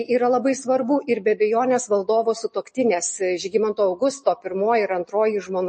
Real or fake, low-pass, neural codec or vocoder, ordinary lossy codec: real; 10.8 kHz; none; MP3, 32 kbps